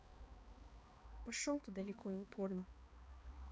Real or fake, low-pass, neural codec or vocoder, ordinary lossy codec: fake; none; codec, 16 kHz, 2 kbps, X-Codec, HuBERT features, trained on balanced general audio; none